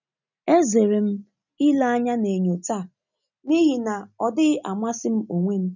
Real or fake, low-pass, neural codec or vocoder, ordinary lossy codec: real; 7.2 kHz; none; none